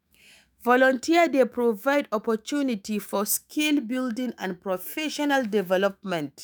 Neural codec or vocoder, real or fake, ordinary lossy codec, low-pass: autoencoder, 48 kHz, 128 numbers a frame, DAC-VAE, trained on Japanese speech; fake; none; none